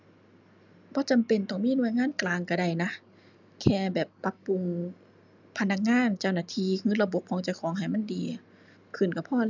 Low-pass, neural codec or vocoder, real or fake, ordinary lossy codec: 7.2 kHz; none; real; none